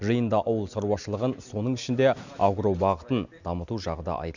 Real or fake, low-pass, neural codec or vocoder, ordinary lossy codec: real; 7.2 kHz; none; none